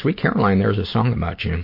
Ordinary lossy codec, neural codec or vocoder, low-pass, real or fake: MP3, 32 kbps; none; 5.4 kHz; real